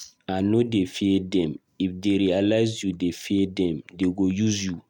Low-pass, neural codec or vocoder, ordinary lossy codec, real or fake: 19.8 kHz; none; MP3, 96 kbps; real